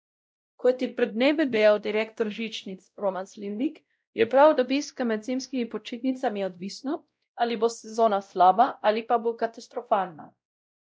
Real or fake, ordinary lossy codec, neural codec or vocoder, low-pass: fake; none; codec, 16 kHz, 0.5 kbps, X-Codec, WavLM features, trained on Multilingual LibriSpeech; none